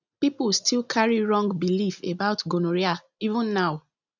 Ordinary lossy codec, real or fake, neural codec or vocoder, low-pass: none; real; none; 7.2 kHz